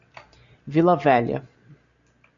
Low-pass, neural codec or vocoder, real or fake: 7.2 kHz; none; real